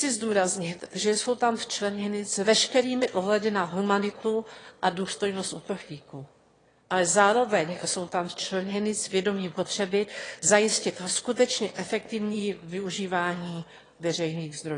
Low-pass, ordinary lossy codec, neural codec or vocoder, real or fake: 9.9 kHz; AAC, 32 kbps; autoencoder, 22.05 kHz, a latent of 192 numbers a frame, VITS, trained on one speaker; fake